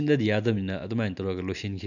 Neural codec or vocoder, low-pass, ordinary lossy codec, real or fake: none; 7.2 kHz; none; real